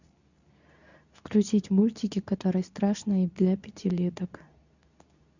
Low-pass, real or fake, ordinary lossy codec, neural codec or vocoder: 7.2 kHz; fake; none; codec, 24 kHz, 0.9 kbps, WavTokenizer, medium speech release version 2